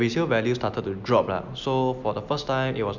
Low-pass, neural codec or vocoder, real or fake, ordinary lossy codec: 7.2 kHz; none; real; none